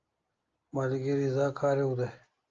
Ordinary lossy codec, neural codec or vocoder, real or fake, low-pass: Opus, 24 kbps; none; real; 7.2 kHz